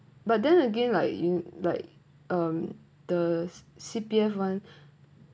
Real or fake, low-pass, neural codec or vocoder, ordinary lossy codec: real; none; none; none